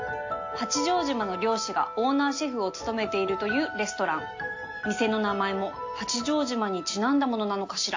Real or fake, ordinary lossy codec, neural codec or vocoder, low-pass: real; AAC, 48 kbps; none; 7.2 kHz